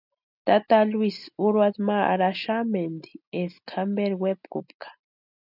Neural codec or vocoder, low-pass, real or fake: none; 5.4 kHz; real